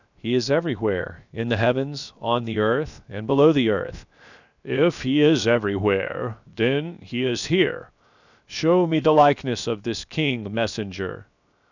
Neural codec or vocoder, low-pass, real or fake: codec, 16 kHz, 0.7 kbps, FocalCodec; 7.2 kHz; fake